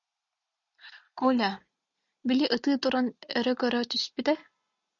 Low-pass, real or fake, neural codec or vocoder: 7.2 kHz; real; none